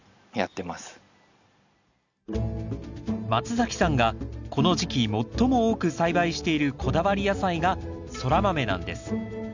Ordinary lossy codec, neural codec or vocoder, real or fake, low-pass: none; none; real; 7.2 kHz